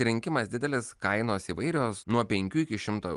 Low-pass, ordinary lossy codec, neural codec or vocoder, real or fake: 10.8 kHz; Opus, 32 kbps; none; real